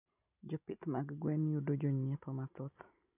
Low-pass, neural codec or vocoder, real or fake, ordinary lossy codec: 3.6 kHz; none; real; none